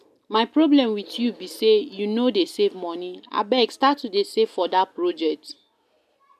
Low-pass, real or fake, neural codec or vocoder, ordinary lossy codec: 14.4 kHz; real; none; none